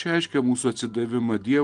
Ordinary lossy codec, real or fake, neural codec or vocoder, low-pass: Opus, 24 kbps; real; none; 9.9 kHz